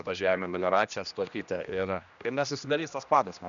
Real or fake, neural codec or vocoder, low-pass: fake; codec, 16 kHz, 1 kbps, X-Codec, HuBERT features, trained on general audio; 7.2 kHz